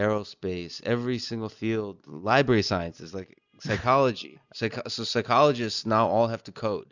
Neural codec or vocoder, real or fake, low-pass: none; real; 7.2 kHz